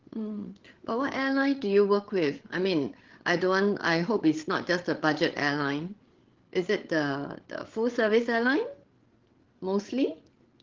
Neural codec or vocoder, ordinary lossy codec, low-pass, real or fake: codec, 16 kHz, 8 kbps, FreqCodec, larger model; Opus, 16 kbps; 7.2 kHz; fake